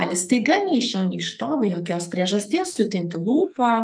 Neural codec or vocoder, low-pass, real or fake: codec, 44.1 kHz, 2.6 kbps, SNAC; 9.9 kHz; fake